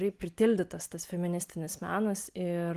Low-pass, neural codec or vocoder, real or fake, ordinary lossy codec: 14.4 kHz; none; real; Opus, 24 kbps